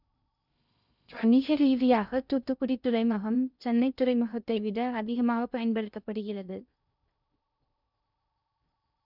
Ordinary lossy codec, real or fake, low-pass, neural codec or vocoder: none; fake; 5.4 kHz; codec, 16 kHz in and 24 kHz out, 0.6 kbps, FocalCodec, streaming, 2048 codes